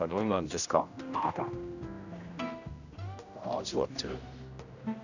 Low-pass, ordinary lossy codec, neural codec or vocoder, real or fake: 7.2 kHz; none; codec, 16 kHz, 1 kbps, X-Codec, HuBERT features, trained on general audio; fake